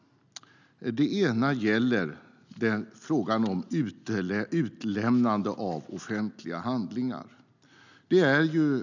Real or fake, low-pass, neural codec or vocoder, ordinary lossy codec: real; 7.2 kHz; none; none